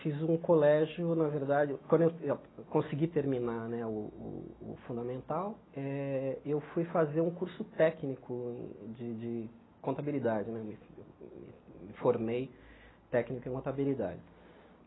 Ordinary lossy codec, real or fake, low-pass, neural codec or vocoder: AAC, 16 kbps; real; 7.2 kHz; none